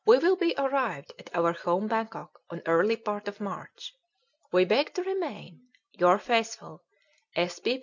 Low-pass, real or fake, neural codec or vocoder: 7.2 kHz; real; none